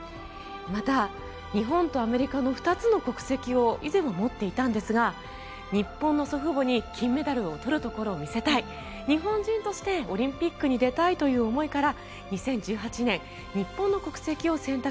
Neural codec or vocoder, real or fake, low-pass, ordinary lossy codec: none; real; none; none